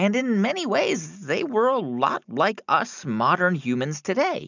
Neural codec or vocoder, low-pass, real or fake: none; 7.2 kHz; real